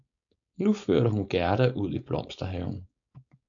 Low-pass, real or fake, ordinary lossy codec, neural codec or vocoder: 7.2 kHz; fake; AAC, 64 kbps; codec, 16 kHz, 4.8 kbps, FACodec